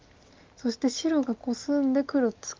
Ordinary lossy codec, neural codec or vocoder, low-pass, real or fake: Opus, 24 kbps; none; 7.2 kHz; real